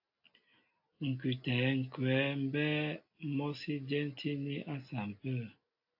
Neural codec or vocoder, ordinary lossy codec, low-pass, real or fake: none; AAC, 48 kbps; 5.4 kHz; real